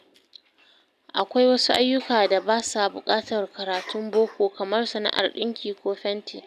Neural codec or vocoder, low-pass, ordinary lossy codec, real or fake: none; 14.4 kHz; none; real